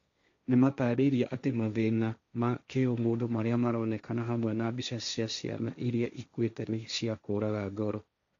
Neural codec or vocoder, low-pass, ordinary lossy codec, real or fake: codec, 16 kHz, 1.1 kbps, Voila-Tokenizer; 7.2 kHz; MP3, 64 kbps; fake